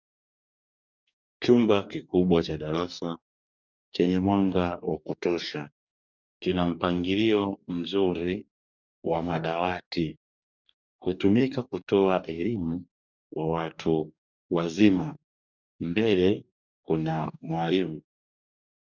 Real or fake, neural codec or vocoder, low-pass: fake; codec, 44.1 kHz, 2.6 kbps, DAC; 7.2 kHz